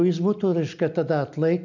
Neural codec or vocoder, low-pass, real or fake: none; 7.2 kHz; real